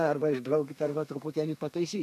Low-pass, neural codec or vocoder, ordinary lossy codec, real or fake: 14.4 kHz; codec, 32 kHz, 1.9 kbps, SNAC; AAC, 64 kbps; fake